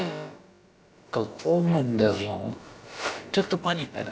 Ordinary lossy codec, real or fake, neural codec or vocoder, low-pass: none; fake; codec, 16 kHz, about 1 kbps, DyCAST, with the encoder's durations; none